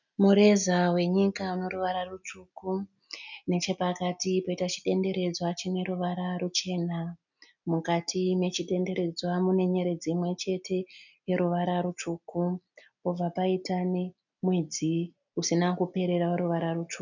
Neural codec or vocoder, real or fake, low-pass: none; real; 7.2 kHz